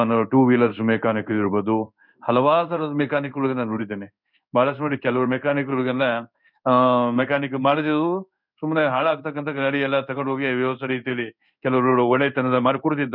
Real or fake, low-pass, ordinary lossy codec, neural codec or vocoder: fake; 5.4 kHz; none; codec, 16 kHz in and 24 kHz out, 1 kbps, XY-Tokenizer